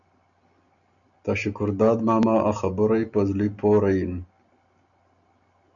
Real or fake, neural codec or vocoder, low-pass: real; none; 7.2 kHz